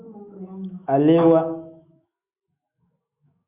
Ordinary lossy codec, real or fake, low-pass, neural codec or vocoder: Opus, 64 kbps; fake; 3.6 kHz; autoencoder, 48 kHz, 128 numbers a frame, DAC-VAE, trained on Japanese speech